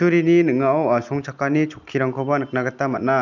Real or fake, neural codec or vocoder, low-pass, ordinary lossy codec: real; none; 7.2 kHz; none